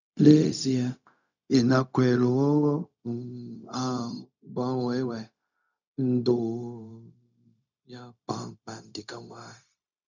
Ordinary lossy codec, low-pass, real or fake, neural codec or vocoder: none; 7.2 kHz; fake; codec, 16 kHz, 0.4 kbps, LongCat-Audio-Codec